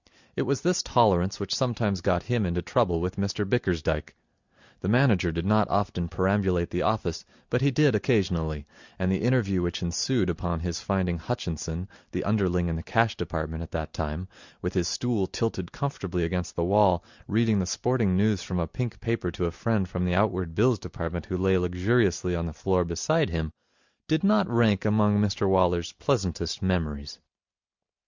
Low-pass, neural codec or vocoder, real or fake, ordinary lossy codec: 7.2 kHz; none; real; Opus, 64 kbps